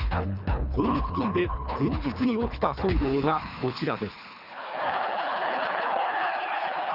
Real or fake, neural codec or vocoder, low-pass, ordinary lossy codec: fake; codec, 24 kHz, 3 kbps, HILCodec; 5.4 kHz; Opus, 64 kbps